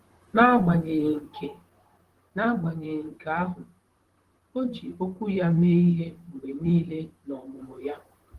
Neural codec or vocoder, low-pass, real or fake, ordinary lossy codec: vocoder, 44.1 kHz, 128 mel bands, Pupu-Vocoder; 14.4 kHz; fake; Opus, 16 kbps